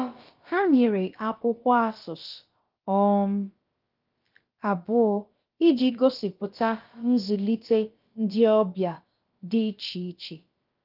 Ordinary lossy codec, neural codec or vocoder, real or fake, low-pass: Opus, 32 kbps; codec, 16 kHz, about 1 kbps, DyCAST, with the encoder's durations; fake; 5.4 kHz